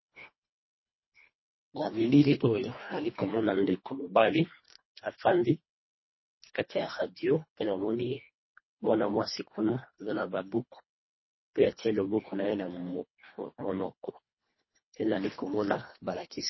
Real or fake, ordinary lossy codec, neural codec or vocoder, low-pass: fake; MP3, 24 kbps; codec, 24 kHz, 1.5 kbps, HILCodec; 7.2 kHz